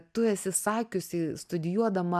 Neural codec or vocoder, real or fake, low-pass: none; real; 14.4 kHz